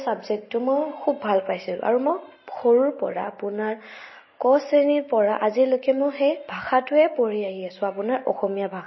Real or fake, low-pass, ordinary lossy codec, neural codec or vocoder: real; 7.2 kHz; MP3, 24 kbps; none